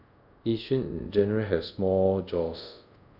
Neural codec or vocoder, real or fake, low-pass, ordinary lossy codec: codec, 24 kHz, 0.5 kbps, DualCodec; fake; 5.4 kHz; none